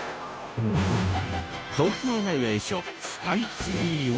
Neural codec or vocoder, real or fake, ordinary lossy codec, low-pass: codec, 16 kHz, 0.5 kbps, FunCodec, trained on Chinese and English, 25 frames a second; fake; none; none